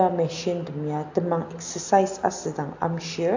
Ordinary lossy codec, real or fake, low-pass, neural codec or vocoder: AAC, 48 kbps; real; 7.2 kHz; none